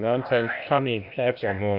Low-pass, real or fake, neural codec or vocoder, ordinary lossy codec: 5.4 kHz; fake; codec, 16 kHz, 0.8 kbps, ZipCodec; Opus, 64 kbps